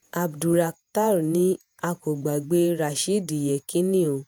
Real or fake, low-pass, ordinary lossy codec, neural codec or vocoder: fake; none; none; vocoder, 48 kHz, 128 mel bands, Vocos